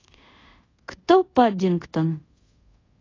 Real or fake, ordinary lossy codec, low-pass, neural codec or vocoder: fake; AAC, 32 kbps; 7.2 kHz; codec, 24 kHz, 0.5 kbps, DualCodec